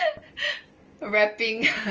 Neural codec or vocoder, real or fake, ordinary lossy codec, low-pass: none; real; Opus, 24 kbps; 7.2 kHz